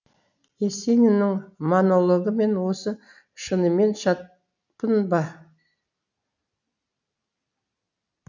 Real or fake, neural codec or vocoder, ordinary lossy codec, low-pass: real; none; none; 7.2 kHz